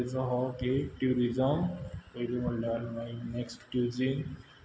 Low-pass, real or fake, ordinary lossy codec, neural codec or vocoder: none; real; none; none